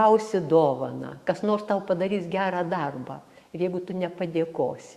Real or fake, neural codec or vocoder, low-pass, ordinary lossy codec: fake; autoencoder, 48 kHz, 128 numbers a frame, DAC-VAE, trained on Japanese speech; 14.4 kHz; Opus, 64 kbps